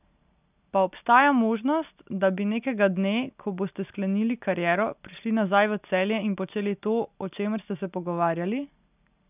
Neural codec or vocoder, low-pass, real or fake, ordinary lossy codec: none; 3.6 kHz; real; none